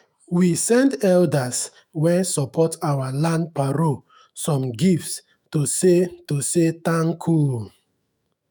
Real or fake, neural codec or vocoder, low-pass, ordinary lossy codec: fake; autoencoder, 48 kHz, 128 numbers a frame, DAC-VAE, trained on Japanese speech; none; none